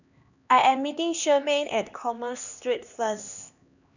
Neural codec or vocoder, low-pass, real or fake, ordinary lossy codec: codec, 16 kHz, 2 kbps, X-Codec, HuBERT features, trained on LibriSpeech; 7.2 kHz; fake; none